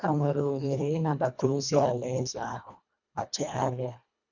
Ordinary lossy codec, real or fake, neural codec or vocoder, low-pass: none; fake; codec, 24 kHz, 1.5 kbps, HILCodec; 7.2 kHz